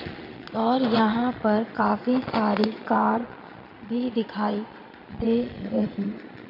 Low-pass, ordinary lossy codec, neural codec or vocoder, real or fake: 5.4 kHz; none; vocoder, 22.05 kHz, 80 mel bands, Vocos; fake